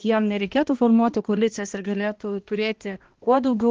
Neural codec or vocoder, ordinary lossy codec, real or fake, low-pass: codec, 16 kHz, 1 kbps, X-Codec, HuBERT features, trained on balanced general audio; Opus, 16 kbps; fake; 7.2 kHz